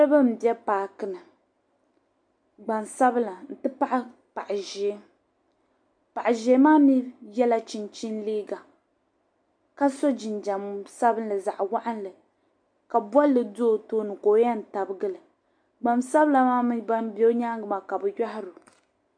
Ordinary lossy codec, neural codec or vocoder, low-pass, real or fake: MP3, 64 kbps; none; 9.9 kHz; real